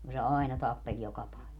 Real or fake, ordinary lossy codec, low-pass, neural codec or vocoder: real; none; 19.8 kHz; none